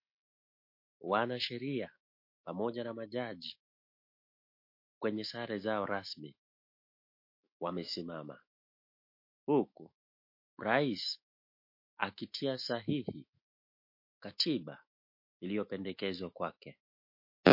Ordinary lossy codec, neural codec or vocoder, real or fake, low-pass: MP3, 32 kbps; autoencoder, 48 kHz, 128 numbers a frame, DAC-VAE, trained on Japanese speech; fake; 5.4 kHz